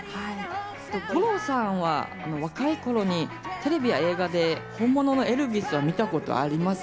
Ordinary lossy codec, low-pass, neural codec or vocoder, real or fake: none; none; none; real